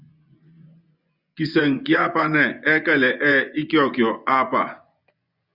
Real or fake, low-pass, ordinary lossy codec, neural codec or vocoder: fake; 5.4 kHz; Opus, 64 kbps; vocoder, 24 kHz, 100 mel bands, Vocos